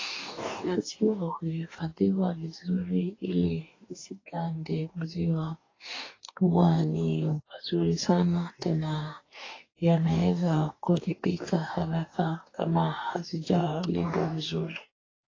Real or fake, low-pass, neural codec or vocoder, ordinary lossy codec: fake; 7.2 kHz; codec, 44.1 kHz, 2.6 kbps, DAC; AAC, 32 kbps